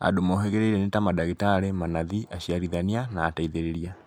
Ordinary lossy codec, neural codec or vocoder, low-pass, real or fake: MP3, 96 kbps; none; 19.8 kHz; real